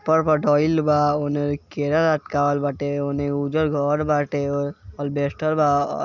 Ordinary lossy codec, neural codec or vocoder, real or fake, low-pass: none; none; real; 7.2 kHz